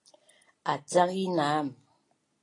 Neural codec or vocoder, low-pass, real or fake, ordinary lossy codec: none; 10.8 kHz; real; AAC, 32 kbps